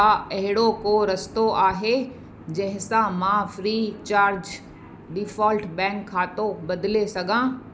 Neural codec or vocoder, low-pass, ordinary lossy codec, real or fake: none; none; none; real